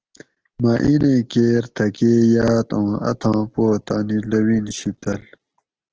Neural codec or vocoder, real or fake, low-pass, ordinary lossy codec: none; real; 7.2 kHz; Opus, 16 kbps